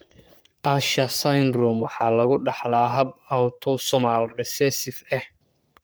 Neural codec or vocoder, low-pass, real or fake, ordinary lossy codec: codec, 44.1 kHz, 3.4 kbps, Pupu-Codec; none; fake; none